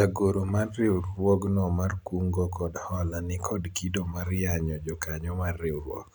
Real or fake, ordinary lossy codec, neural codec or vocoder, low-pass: real; none; none; none